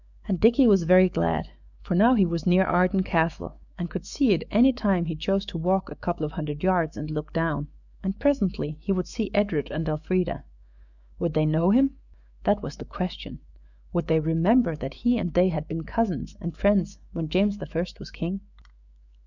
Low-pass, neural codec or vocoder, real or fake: 7.2 kHz; autoencoder, 48 kHz, 128 numbers a frame, DAC-VAE, trained on Japanese speech; fake